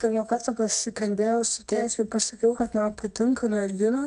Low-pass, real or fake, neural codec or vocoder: 10.8 kHz; fake; codec, 24 kHz, 0.9 kbps, WavTokenizer, medium music audio release